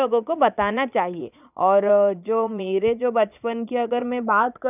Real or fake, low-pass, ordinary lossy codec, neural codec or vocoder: fake; 3.6 kHz; none; vocoder, 22.05 kHz, 80 mel bands, Vocos